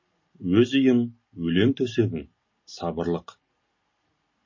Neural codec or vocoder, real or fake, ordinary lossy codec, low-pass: none; real; MP3, 32 kbps; 7.2 kHz